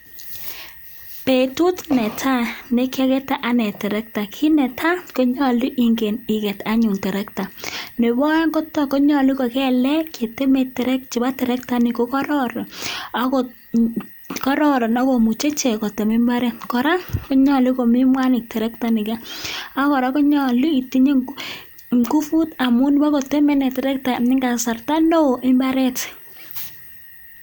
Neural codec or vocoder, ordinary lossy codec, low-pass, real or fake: none; none; none; real